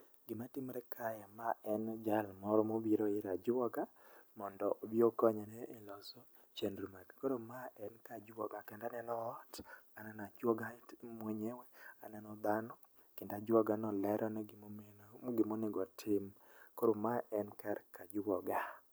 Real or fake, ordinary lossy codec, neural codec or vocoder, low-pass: real; none; none; none